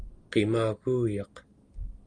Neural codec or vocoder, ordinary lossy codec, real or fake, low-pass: none; Opus, 32 kbps; real; 9.9 kHz